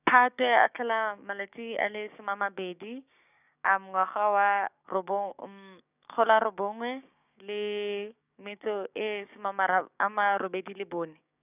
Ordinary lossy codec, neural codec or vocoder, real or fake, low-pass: none; codec, 16 kHz, 6 kbps, DAC; fake; 3.6 kHz